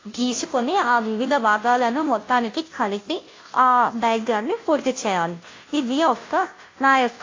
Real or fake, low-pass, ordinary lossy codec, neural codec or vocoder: fake; 7.2 kHz; AAC, 32 kbps; codec, 16 kHz, 0.5 kbps, FunCodec, trained on Chinese and English, 25 frames a second